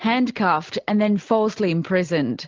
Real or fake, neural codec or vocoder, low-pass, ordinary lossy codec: real; none; 7.2 kHz; Opus, 32 kbps